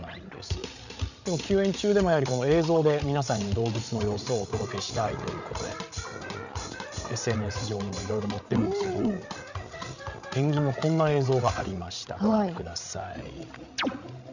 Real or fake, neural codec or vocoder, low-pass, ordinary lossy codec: fake; codec, 16 kHz, 8 kbps, FreqCodec, larger model; 7.2 kHz; none